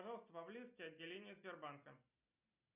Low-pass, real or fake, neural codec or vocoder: 3.6 kHz; real; none